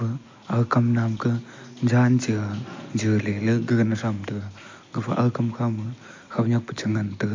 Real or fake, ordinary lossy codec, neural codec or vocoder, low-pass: real; MP3, 48 kbps; none; 7.2 kHz